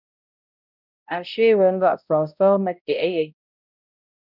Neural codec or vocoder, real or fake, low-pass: codec, 16 kHz, 0.5 kbps, X-Codec, HuBERT features, trained on balanced general audio; fake; 5.4 kHz